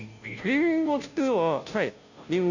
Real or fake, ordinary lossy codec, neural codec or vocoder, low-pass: fake; none; codec, 16 kHz, 0.5 kbps, FunCodec, trained on Chinese and English, 25 frames a second; 7.2 kHz